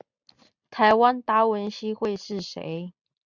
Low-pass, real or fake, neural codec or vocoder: 7.2 kHz; real; none